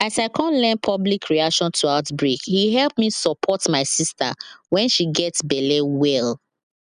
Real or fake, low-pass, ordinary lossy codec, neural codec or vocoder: real; 9.9 kHz; none; none